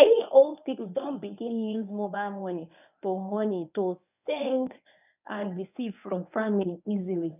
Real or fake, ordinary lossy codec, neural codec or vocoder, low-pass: fake; none; codec, 24 kHz, 0.9 kbps, WavTokenizer, medium speech release version 1; 3.6 kHz